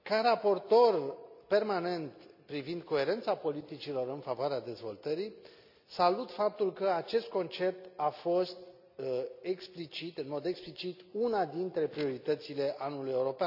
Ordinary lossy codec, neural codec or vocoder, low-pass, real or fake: none; none; 5.4 kHz; real